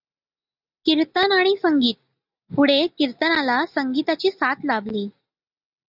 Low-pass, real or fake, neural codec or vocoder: 5.4 kHz; real; none